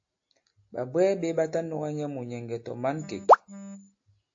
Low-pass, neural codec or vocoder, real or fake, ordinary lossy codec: 7.2 kHz; none; real; AAC, 48 kbps